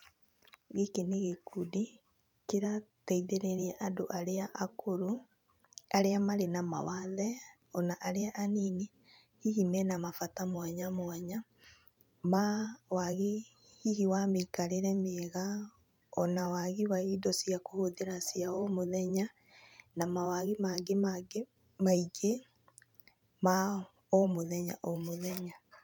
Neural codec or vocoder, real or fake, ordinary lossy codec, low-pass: vocoder, 44.1 kHz, 128 mel bands every 512 samples, BigVGAN v2; fake; none; 19.8 kHz